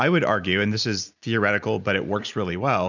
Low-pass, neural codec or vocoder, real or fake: 7.2 kHz; none; real